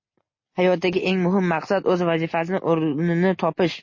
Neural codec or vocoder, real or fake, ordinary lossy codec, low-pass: none; real; MP3, 32 kbps; 7.2 kHz